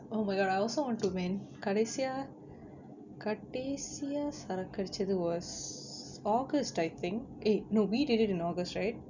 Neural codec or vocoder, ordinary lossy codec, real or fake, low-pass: none; none; real; 7.2 kHz